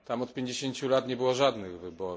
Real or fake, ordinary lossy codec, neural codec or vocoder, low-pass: real; none; none; none